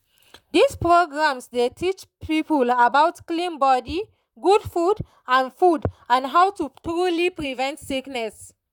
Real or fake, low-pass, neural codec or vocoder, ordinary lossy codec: fake; 19.8 kHz; vocoder, 44.1 kHz, 128 mel bands, Pupu-Vocoder; none